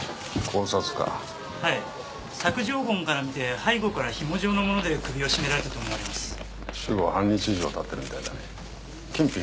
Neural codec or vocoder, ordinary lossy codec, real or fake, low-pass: none; none; real; none